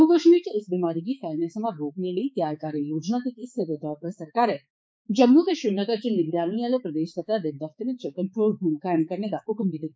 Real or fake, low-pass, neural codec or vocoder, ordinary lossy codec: fake; none; codec, 16 kHz, 4 kbps, X-Codec, HuBERT features, trained on balanced general audio; none